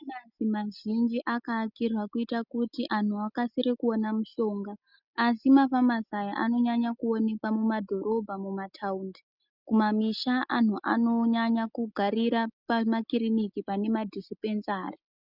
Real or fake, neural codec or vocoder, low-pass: real; none; 5.4 kHz